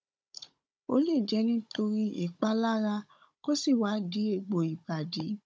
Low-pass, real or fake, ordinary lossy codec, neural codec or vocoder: none; fake; none; codec, 16 kHz, 16 kbps, FunCodec, trained on Chinese and English, 50 frames a second